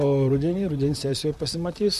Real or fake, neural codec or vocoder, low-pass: fake; vocoder, 44.1 kHz, 128 mel bands, Pupu-Vocoder; 14.4 kHz